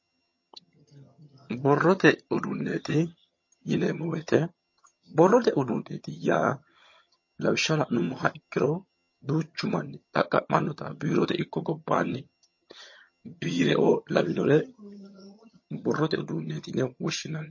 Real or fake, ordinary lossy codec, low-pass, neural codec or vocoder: fake; MP3, 32 kbps; 7.2 kHz; vocoder, 22.05 kHz, 80 mel bands, HiFi-GAN